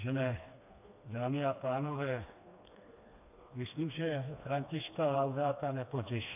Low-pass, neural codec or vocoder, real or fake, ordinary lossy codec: 3.6 kHz; codec, 16 kHz, 2 kbps, FreqCodec, smaller model; fake; MP3, 32 kbps